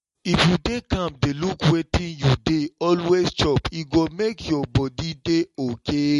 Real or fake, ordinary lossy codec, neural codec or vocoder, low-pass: real; MP3, 48 kbps; none; 14.4 kHz